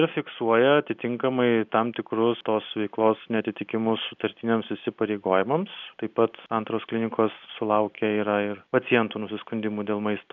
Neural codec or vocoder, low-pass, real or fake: none; 7.2 kHz; real